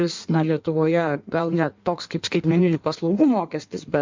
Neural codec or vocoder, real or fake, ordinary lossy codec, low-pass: codec, 16 kHz in and 24 kHz out, 1.1 kbps, FireRedTTS-2 codec; fake; MP3, 64 kbps; 7.2 kHz